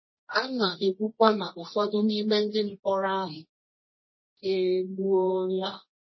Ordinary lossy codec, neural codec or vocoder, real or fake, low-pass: MP3, 24 kbps; codec, 24 kHz, 0.9 kbps, WavTokenizer, medium music audio release; fake; 7.2 kHz